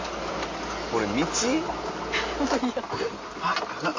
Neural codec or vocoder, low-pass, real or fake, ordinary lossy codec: none; 7.2 kHz; real; MP3, 32 kbps